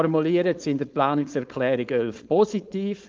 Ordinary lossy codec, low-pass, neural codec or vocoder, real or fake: Opus, 32 kbps; 7.2 kHz; codec, 16 kHz, 4.8 kbps, FACodec; fake